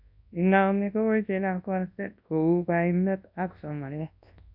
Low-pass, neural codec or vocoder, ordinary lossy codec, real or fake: 5.4 kHz; codec, 24 kHz, 0.9 kbps, WavTokenizer, large speech release; AAC, 48 kbps; fake